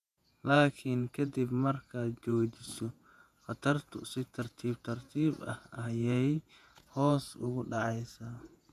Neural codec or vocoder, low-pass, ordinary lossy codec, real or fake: vocoder, 48 kHz, 128 mel bands, Vocos; 14.4 kHz; none; fake